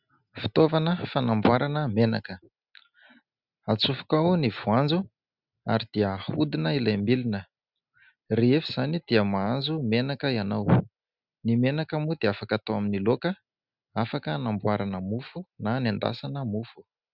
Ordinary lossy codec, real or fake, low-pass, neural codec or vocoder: Opus, 64 kbps; real; 5.4 kHz; none